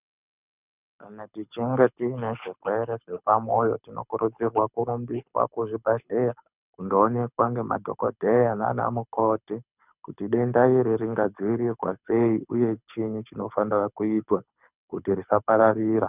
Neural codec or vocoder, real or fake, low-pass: codec, 24 kHz, 6 kbps, HILCodec; fake; 3.6 kHz